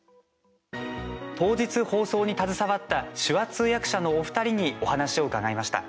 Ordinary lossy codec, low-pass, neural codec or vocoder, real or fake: none; none; none; real